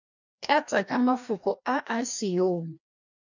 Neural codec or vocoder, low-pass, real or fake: codec, 16 kHz, 1 kbps, FreqCodec, larger model; 7.2 kHz; fake